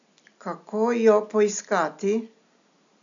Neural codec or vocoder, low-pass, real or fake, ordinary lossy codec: none; 7.2 kHz; real; none